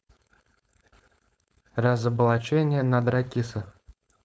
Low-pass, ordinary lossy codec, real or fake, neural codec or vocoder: none; none; fake; codec, 16 kHz, 4.8 kbps, FACodec